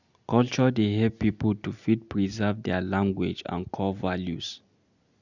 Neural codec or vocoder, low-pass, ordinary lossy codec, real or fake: none; 7.2 kHz; none; real